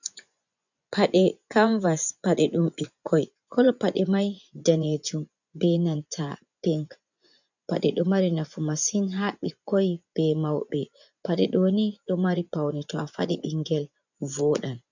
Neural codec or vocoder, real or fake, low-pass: vocoder, 24 kHz, 100 mel bands, Vocos; fake; 7.2 kHz